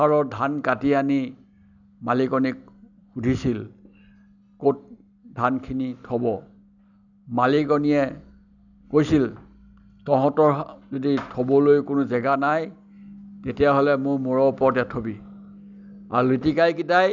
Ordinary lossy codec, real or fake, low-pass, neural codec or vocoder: none; real; 7.2 kHz; none